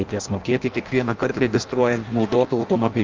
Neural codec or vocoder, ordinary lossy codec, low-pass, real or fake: codec, 16 kHz in and 24 kHz out, 0.6 kbps, FireRedTTS-2 codec; Opus, 24 kbps; 7.2 kHz; fake